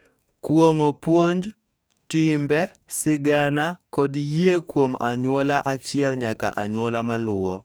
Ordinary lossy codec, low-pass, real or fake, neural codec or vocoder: none; none; fake; codec, 44.1 kHz, 2.6 kbps, DAC